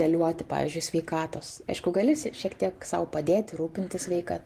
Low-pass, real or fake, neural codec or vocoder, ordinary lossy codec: 14.4 kHz; fake; vocoder, 44.1 kHz, 128 mel bands every 512 samples, BigVGAN v2; Opus, 24 kbps